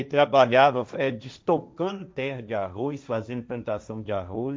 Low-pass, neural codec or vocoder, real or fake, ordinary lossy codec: none; codec, 16 kHz, 1.1 kbps, Voila-Tokenizer; fake; none